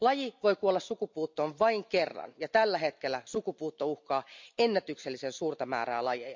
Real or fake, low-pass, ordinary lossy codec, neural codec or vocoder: real; 7.2 kHz; MP3, 64 kbps; none